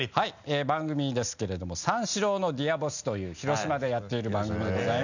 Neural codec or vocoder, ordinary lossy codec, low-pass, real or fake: none; none; 7.2 kHz; real